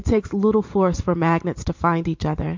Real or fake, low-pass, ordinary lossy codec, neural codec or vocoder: fake; 7.2 kHz; MP3, 48 kbps; vocoder, 44.1 kHz, 128 mel bands every 512 samples, BigVGAN v2